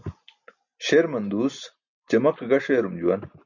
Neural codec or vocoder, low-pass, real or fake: none; 7.2 kHz; real